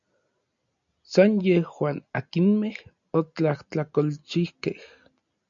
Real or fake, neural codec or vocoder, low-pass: real; none; 7.2 kHz